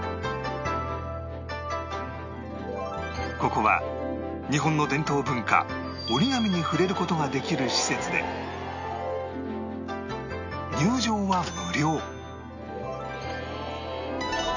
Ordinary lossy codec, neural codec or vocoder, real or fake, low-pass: none; none; real; 7.2 kHz